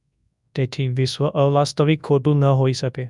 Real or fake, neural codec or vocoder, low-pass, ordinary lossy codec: fake; codec, 24 kHz, 0.9 kbps, WavTokenizer, large speech release; 10.8 kHz; none